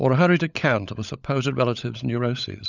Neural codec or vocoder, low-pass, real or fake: codec, 16 kHz, 16 kbps, FunCodec, trained on LibriTTS, 50 frames a second; 7.2 kHz; fake